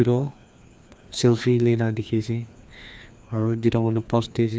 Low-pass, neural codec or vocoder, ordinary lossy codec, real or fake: none; codec, 16 kHz, 2 kbps, FreqCodec, larger model; none; fake